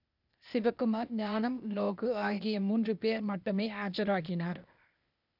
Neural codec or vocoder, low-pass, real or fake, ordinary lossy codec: codec, 16 kHz, 0.8 kbps, ZipCodec; 5.4 kHz; fake; none